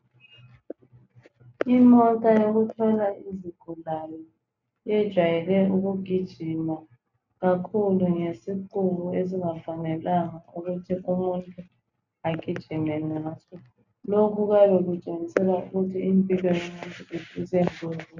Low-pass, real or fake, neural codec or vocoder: 7.2 kHz; real; none